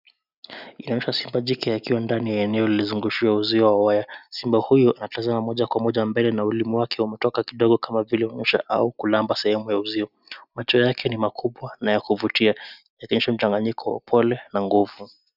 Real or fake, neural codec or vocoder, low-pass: real; none; 5.4 kHz